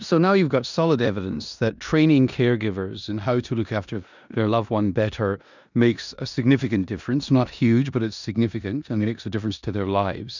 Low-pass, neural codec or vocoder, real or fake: 7.2 kHz; codec, 16 kHz in and 24 kHz out, 0.9 kbps, LongCat-Audio-Codec, four codebook decoder; fake